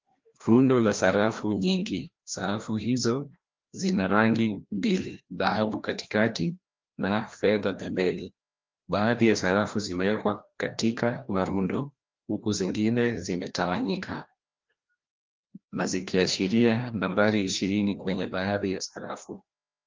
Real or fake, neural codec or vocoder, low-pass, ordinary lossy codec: fake; codec, 16 kHz, 1 kbps, FreqCodec, larger model; 7.2 kHz; Opus, 32 kbps